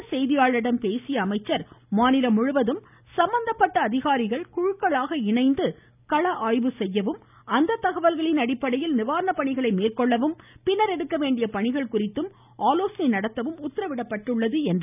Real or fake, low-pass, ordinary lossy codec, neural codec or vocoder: real; 3.6 kHz; none; none